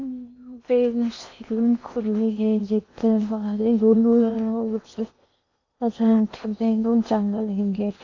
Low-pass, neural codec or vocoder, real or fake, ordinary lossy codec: 7.2 kHz; codec, 16 kHz in and 24 kHz out, 0.8 kbps, FocalCodec, streaming, 65536 codes; fake; AAC, 48 kbps